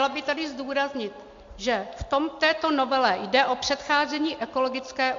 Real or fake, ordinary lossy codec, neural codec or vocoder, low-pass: real; MP3, 48 kbps; none; 7.2 kHz